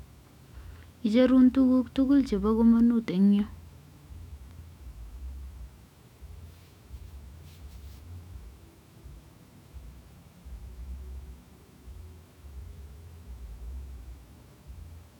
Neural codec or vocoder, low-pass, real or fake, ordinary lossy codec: autoencoder, 48 kHz, 128 numbers a frame, DAC-VAE, trained on Japanese speech; 19.8 kHz; fake; none